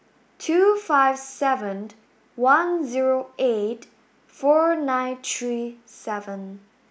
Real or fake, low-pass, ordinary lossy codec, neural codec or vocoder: real; none; none; none